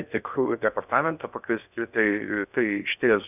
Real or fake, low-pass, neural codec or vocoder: fake; 3.6 kHz; codec, 16 kHz in and 24 kHz out, 0.6 kbps, FocalCodec, streaming, 4096 codes